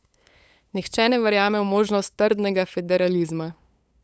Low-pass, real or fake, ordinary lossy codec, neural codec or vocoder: none; fake; none; codec, 16 kHz, 8 kbps, FunCodec, trained on LibriTTS, 25 frames a second